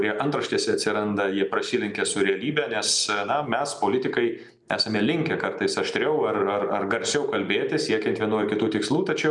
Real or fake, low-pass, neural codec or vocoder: real; 10.8 kHz; none